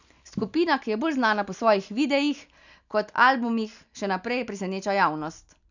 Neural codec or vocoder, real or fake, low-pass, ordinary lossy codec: none; real; 7.2 kHz; none